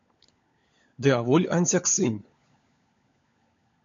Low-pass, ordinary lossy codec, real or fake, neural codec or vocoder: 7.2 kHz; AAC, 64 kbps; fake; codec, 16 kHz, 16 kbps, FunCodec, trained on LibriTTS, 50 frames a second